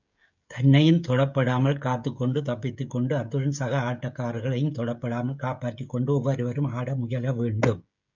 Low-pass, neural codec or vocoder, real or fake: 7.2 kHz; codec, 16 kHz, 16 kbps, FreqCodec, smaller model; fake